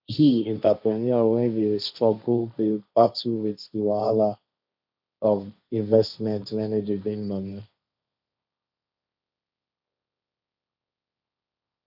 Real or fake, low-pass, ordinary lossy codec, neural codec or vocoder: fake; 5.4 kHz; none; codec, 16 kHz, 1.1 kbps, Voila-Tokenizer